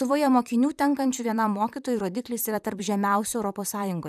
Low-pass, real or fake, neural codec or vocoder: 14.4 kHz; fake; vocoder, 44.1 kHz, 128 mel bands, Pupu-Vocoder